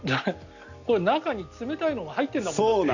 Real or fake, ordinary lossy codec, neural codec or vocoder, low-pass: real; none; none; 7.2 kHz